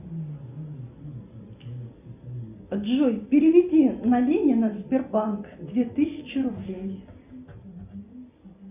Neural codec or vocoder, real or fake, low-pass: none; real; 3.6 kHz